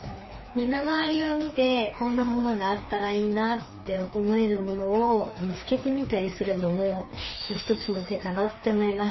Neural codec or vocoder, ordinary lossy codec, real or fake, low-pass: codec, 16 kHz, 2 kbps, FreqCodec, larger model; MP3, 24 kbps; fake; 7.2 kHz